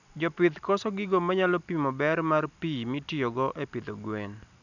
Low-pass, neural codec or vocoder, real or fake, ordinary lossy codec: 7.2 kHz; none; real; none